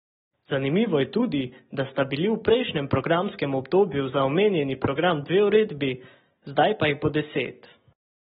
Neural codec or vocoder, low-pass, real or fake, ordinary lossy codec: none; 7.2 kHz; real; AAC, 16 kbps